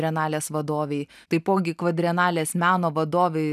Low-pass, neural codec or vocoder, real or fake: 14.4 kHz; none; real